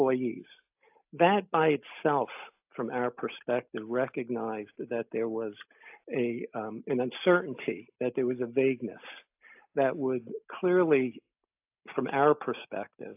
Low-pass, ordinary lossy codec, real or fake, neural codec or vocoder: 3.6 kHz; AAC, 32 kbps; real; none